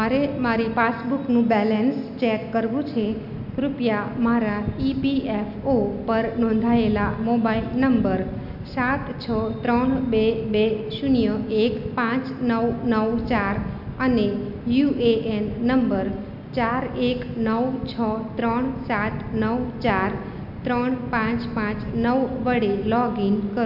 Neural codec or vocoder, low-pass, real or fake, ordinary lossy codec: none; 5.4 kHz; real; none